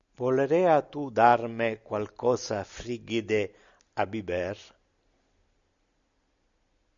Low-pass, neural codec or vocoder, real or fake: 7.2 kHz; none; real